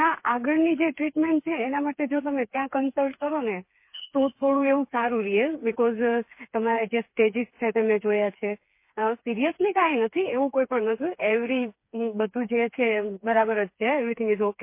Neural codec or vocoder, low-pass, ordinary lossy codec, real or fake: codec, 16 kHz, 4 kbps, FreqCodec, smaller model; 3.6 kHz; MP3, 24 kbps; fake